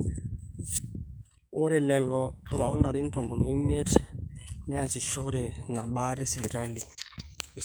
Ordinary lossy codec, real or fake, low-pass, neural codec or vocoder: none; fake; none; codec, 44.1 kHz, 2.6 kbps, SNAC